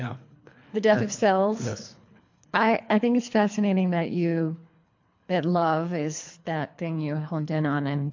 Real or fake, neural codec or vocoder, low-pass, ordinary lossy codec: fake; codec, 24 kHz, 3 kbps, HILCodec; 7.2 kHz; MP3, 48 kbps